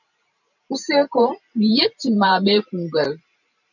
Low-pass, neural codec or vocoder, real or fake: 7.2 kHz; vocoder, 44.1 kHz, 128 mel bands every 512 samples, BigVGAN v2; fake